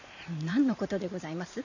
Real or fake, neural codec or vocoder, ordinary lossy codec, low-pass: fake; vocoder, 44.1 kHz, 128 mel bands every 512 samples, BigVGAN v2; none; 7.2 kHz